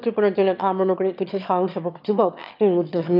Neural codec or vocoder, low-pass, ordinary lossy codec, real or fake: autoencoder, 22.05 kHz, a latent of 192 numbers a frame, VITS, trained on one speaker; 5.4 kHz; none; fake